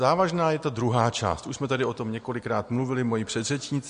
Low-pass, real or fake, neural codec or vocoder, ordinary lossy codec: 9.9 kHz; real; none; MP3, 48 kbps